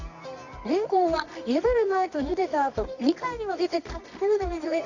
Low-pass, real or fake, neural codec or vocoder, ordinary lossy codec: 7.2 kHz; fake; codec, 24 kHz, 0.9 kbps, WavTokenizer, medium music audio release; none